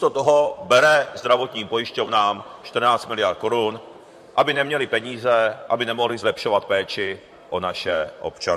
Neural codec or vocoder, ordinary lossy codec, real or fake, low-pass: vocoder, 44.1 kHz, 128 mel bands, Pupu-Vocoder; MP3, 64 kbps; fake; 14.4 kHz